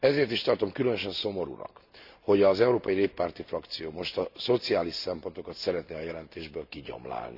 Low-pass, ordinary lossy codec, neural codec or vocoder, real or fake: 5.4 kHz; none; none; real